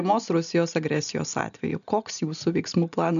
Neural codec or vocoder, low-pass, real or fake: none; 7.2 kHz; real